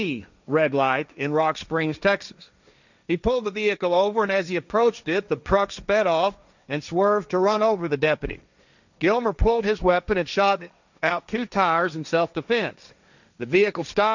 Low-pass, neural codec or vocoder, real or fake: 7.2 kHz; codec, 16 kHz, 1.1 kbps, Voila-Tokenizer; fake